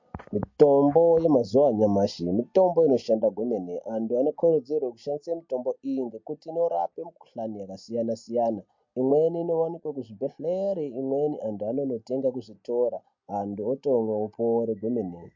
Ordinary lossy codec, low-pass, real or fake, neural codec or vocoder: MP3, 48 kbps; 7.2 kHz; real; none